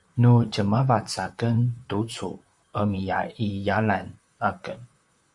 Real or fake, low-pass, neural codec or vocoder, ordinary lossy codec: fake; 10.8 kHz; vocoder, 44.1 kHz, 128 mel bands, Pupu-Vocoder; Opus, 64 kbps